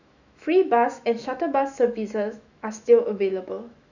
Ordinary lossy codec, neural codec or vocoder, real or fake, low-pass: none; autoencoder, 48 kHz, 128 numbers a frame, DAC-VAE, trained on Japanese speech; fake; 7.2 kHz